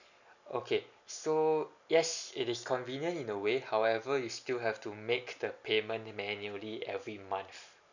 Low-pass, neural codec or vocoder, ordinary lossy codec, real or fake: 7.2 kHz; none; none; real